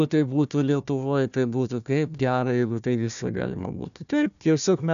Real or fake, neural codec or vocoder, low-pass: fake; codec, 16 kHz, 1 kbps, FunCodec, trained on Chinese and English, 50 frames a second; 7.2 kHz